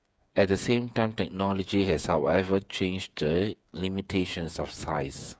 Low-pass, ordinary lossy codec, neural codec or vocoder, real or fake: none; none; codec, 16 kHz, 8 kbps, FreqCodec, smaller model; fake